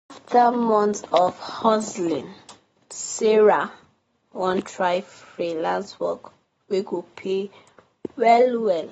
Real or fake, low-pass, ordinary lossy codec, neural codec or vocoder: real; 19.8 kHz; AAC, 24 kbps; none